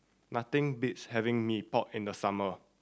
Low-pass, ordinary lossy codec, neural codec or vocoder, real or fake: none; none; none; real